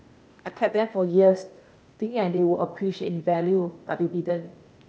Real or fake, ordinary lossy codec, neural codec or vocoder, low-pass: fake; none; codec, 16 kHz, 0.8 kbps, ZipCodec; none